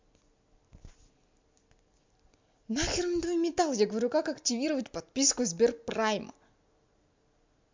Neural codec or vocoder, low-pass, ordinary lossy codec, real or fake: none; 7.2 kHz; MP3, 64 kbps; real